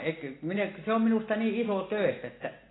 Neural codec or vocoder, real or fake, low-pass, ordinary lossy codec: none; real; 7.2 kHz; AAC, 16 kbps